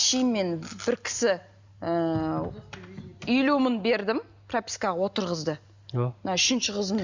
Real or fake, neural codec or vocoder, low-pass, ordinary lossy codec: real; none; 7.2 kHz; Opus, 64 kbps